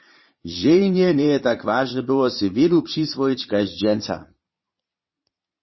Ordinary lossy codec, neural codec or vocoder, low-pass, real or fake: MP3, 24 kbps; vocoder, 22.05 kHz, 80 mel bands, Vocos; 7.2 kHz; fake